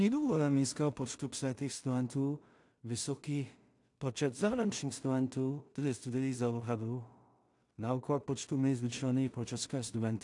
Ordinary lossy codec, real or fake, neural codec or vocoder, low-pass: AAC, 64 kbps; fake; codec, 16 kHz in and 24 kHz out, 0.4 kbps, LongCat-Audio-Codec, two codebook decoder; 10.8 kHz